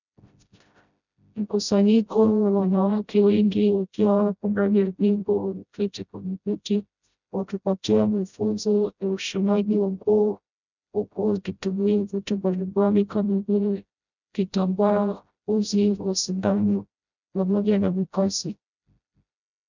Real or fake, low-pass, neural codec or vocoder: fake; 7.2 kHz; codec, 16 kHz, 0.5 kbps, FreqCodec, smaller model